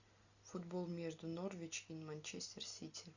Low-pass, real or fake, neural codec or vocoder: 7.2 kHz; real; none